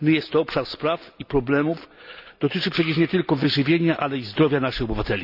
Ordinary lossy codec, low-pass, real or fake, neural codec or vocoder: none; 5.4 kHz; real; none